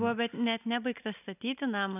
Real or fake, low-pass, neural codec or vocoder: real; 3.6 kHz; none